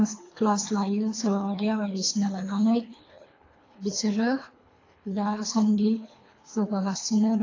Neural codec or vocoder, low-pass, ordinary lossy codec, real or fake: codec, 24 kHz, 3 kbps, HILCodec; 7.2 kHz; AAC, 32 kbps; fake